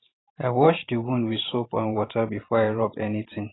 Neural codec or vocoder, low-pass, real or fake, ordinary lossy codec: vocoder, 44.1 kHz, 128 mel bands every 512 samples, BigVGAN v2; 7.2 kHz; fake; AAC, 16 kbps